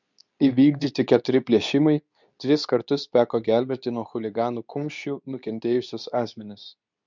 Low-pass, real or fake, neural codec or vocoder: 7.2 kHz; fake; codec, 24 kHz, 0.9 kbps, WavTokenizer, medium speech release version 2